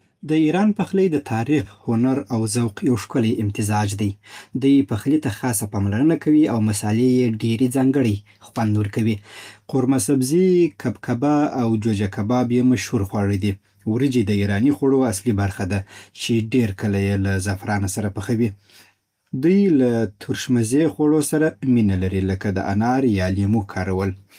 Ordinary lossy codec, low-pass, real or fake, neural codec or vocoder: Opus, 32 kbps; 14.4 kHz; real; none